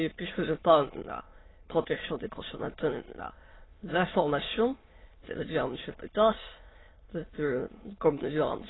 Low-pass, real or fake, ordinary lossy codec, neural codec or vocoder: 7.2 kHz; fake; AAC, 16 kbps; autoencoder, 22.05 kHz, a latent of 192 numbers a frame, VITS, trained on many speakers